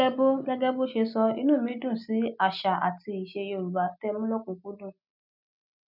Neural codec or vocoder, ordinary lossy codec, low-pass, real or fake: none; none; 5.4 kHz; real